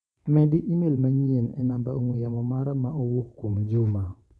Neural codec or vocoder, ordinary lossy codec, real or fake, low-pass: vocoder, 22.05 kHz, 80 mel bands, WaveNeXt; none; fake; none